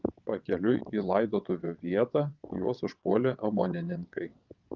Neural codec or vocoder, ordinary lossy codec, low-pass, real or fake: vocoder, 22.05 kHz, 80 mel bands, WaveNeXt; Opus, 32 kbps; 7.2 kHz; fake